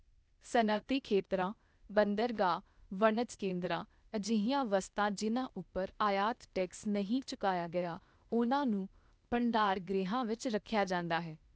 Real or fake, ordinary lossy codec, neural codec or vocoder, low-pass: fake; none; codec, 16 kHz, 0.8 kbps, ZipCodec; none